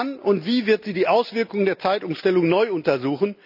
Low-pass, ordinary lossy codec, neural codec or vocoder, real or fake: 5.4 kHz; none; none; real